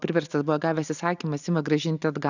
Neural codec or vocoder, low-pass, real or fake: none; 7.2 kHz; real